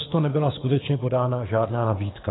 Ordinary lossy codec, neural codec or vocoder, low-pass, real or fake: AAC, 16 kbps; codec, 24 kHz, 6 kbps, HILCodec; 7.2 kHz; fake